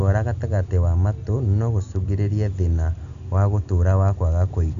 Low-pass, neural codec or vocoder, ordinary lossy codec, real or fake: 7.2 kHz; none; none; real